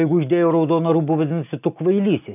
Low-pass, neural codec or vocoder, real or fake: 3.6 kHz; none; real